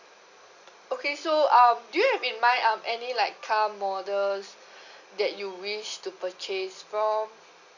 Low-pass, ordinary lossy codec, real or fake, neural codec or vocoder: 7.2 kHz; none; real; none